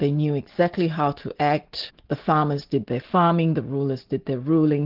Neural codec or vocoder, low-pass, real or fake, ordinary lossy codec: none; 5.4 kHz; real; Opus, 16 kbps